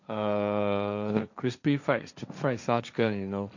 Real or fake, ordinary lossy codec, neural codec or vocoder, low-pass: fake; none; codec, 16 kHz, 1.1 kbps, Voila-Tokenizer; 7.2 kHz